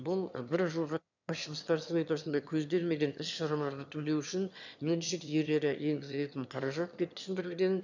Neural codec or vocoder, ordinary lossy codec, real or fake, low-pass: autoencoder, 22.05 kHz, a latent of 192 numbers a frame, VITS, trained on one speaker; none; fake; 7.2 kHz